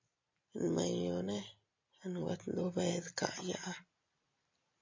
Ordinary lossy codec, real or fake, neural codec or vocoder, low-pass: MP3, 48 kbps; real; none; 7.2 kHz